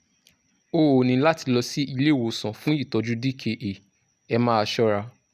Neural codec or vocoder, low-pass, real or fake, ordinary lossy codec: none; 14.4 kHz; real; none